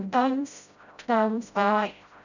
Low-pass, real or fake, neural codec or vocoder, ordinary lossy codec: 7.2 kHz; fake; codec, 16 kHz, 0.5 kbps, FreqCodec, smaller model; none